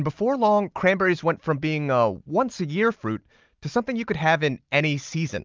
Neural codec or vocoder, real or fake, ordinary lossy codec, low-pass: none; real; Opus, 24 kbps; 7.2 kHz